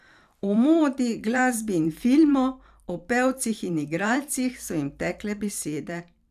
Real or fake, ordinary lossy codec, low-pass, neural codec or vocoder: fake; none; 14.4 kHz; vocoder, 44.1 kHz, 128 mel bands every 512 samples, BigVGAN v2